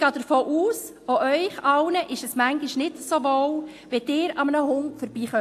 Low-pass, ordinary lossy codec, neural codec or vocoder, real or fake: 14.4 kHz; AAC, 64 kbps; none; real